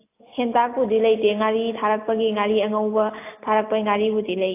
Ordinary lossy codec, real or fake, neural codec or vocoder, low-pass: AAC, 24 kbps; real; none; 3.6 kHz